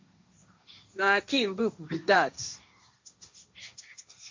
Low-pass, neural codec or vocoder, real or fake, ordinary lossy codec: 7.2 kHz; codec, 16 kHz, 1.1 kbps, Voila-Tokenizer; fake; MP3, 48 kbps